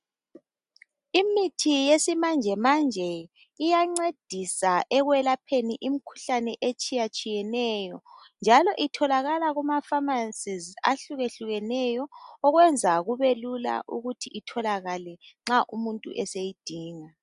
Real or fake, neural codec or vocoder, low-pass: real; none; 10.8 kHz